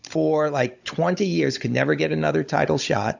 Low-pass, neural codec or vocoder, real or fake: 7.2 kHz; codec, 16 kHz, 16 kbps, FunCodec, trained on Chinese and English, 50 frames a second; fake